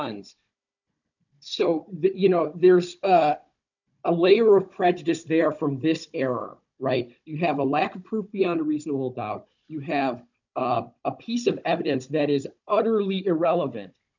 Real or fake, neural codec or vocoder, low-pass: fake; codec, 16 kHz, 16 kbps, FunCodec, trained on Chinese and English, 50 frames a second; 7.2 kHz